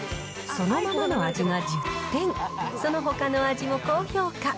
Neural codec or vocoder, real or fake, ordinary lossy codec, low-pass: none; real; none; none